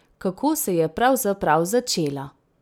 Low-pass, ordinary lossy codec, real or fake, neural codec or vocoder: none; none; real; none